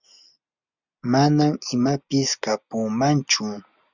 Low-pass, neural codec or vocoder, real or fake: 7.2 kHz; none; real